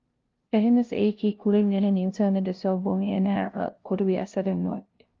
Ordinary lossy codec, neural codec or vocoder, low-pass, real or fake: Opus, 32 kbps; codec, 16 kHz, 0.5 kbps, FunCodec, trained on LibriTTS, 25 frames a second; 7.2 kHz; fake